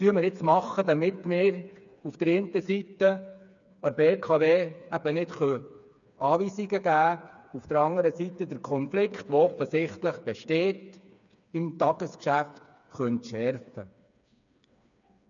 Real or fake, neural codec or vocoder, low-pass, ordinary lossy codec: fake; codec, 16 kHz, 4 kbps, FreqCodec, smaller model; 7.2 kHz; none